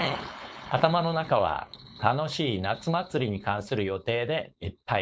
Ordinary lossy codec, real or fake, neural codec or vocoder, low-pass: none; fake; codec, 16 kHz, 4.8 kbps, FACodec; none